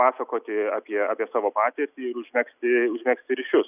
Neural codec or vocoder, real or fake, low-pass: none; real; 3.6 kHz